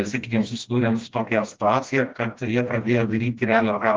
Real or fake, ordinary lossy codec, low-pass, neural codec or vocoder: fake; Opus, 24 kbps; 7.2 kHz; codec, 16 kHz, 1 kbps, FreqCodec, smaller model